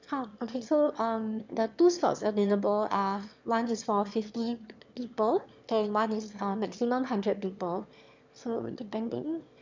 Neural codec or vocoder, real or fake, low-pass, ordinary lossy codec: autoencoder, 22.05 kHz, a latent of 192 numbers a frame, VITS, trained on one speaker; fake; 7.2 kHz; MP3, 64 kbps